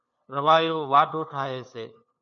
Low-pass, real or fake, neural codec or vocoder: 7.2 kHz; fake; codec, 16 kHz, 2 kbps, FunCodec, trained on LibriTTS, 25 frames a second